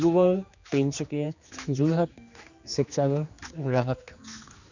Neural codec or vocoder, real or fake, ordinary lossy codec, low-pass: codec, 16 kHz, 2 kbps, X-Codec, HuBERT features, trained on general audio; fake; none; 7.2 kHz